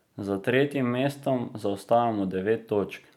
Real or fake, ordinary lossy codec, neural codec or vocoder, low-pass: real; none; none; 19.8 kHz